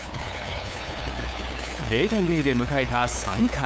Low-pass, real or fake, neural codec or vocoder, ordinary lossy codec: none; fake; codec, 16 kHz, 2 kbps, FunCodec, trained on LibriTTS, 25 frames a second; none